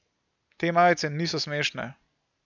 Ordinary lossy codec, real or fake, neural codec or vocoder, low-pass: none; real; none; 7.2 kHz